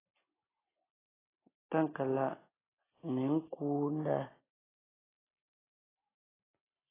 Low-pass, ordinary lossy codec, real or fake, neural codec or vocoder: 3.6 kHz; AAC, 16 kbps; real; none